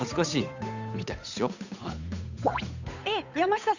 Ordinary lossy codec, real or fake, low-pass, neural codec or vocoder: none; fake; 7.2 kHz; codec, 16 kHz, 8 kbps, FunCodec, trained on Chinese and English, 25 frames a second